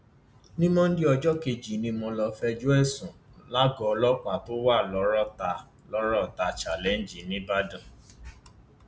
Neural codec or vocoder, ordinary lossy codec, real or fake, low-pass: none; none; real; none